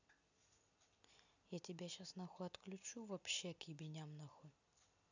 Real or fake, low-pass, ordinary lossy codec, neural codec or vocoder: real; 7.2 kHz; none; none